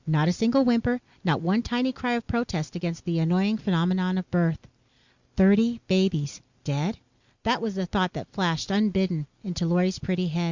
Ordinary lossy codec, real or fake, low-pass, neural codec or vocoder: Opus, 64 kbps; real; 7.2 kHz; none